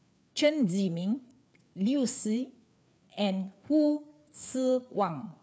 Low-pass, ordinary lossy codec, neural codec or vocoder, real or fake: none; none; codec, 16 kHz, 4 kbps, FreqCodec, larger model; fake